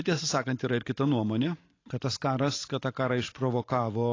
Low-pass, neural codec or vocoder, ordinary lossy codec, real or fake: 7.2 kHz; vocoder, 44.1 kHz, 128 mel bands every 512 samples, BigVGAN v2; AAC, 32 kbps; fake